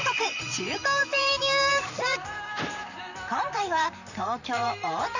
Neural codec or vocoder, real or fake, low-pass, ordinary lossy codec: vocoder, 44.1 kHz, 128 mel bands, Pupu-Vocoder; fake; 7.2 kHz; none